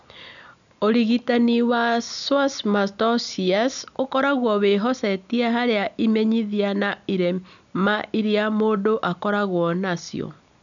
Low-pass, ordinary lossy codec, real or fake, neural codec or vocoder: 7.2 kHz; none; real; none